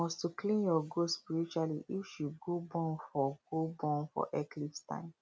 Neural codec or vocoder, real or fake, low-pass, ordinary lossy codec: none; real; none; none